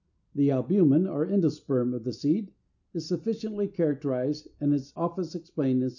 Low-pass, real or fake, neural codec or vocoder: 7.2 kHz; real; none